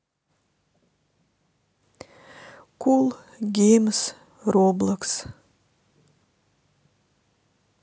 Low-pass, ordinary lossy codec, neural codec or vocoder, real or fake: none; none; none; real